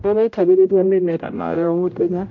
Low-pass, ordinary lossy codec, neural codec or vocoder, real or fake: 7.2 kHz; MP3, 48 kbps; codec, 16 kHz, 0.5 kbps, X-Codec, HuBERT features, trained on general audio; fake